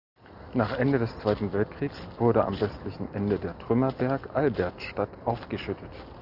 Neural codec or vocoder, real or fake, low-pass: none; real; 5.4 kHz